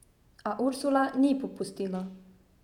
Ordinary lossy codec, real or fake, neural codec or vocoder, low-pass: none; fake; vocoder, 48 kHz, 128 mel bands, Vocos; 19.8 kHz